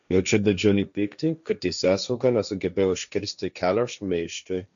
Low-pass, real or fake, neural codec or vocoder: 7.2 kHz; fake; codec, 16 kHz, 1.1 kbps, Voila-Tokenizer